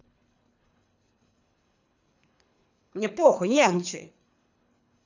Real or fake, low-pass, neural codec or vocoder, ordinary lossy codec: fake; 7.2 kHz; codec, 24 kHz, 3 kbps, HILCodec; none